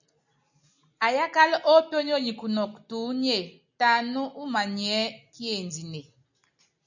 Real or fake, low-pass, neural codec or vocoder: real; 7.2 kHz; none